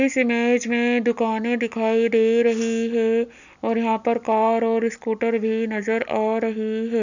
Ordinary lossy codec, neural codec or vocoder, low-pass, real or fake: none; none; 7.2 kHz; real